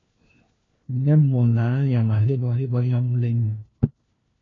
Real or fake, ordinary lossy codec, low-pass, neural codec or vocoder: fake; AAC, 32 kbps; 7.2 kHz; codec, 16 kHz, 1 kbps, FunCodec, trained on LibriTTS, 50 frames a second